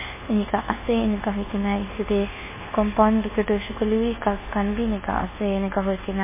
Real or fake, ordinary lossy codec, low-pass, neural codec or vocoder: fake; MP3, 16 kbps; 3.6 kHz; codec, 24 kHz, 1.2 kbps, DualCodec